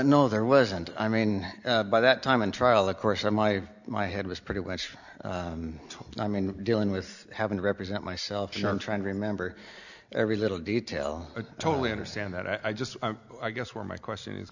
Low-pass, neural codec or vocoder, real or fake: 7.2 kHz; none; real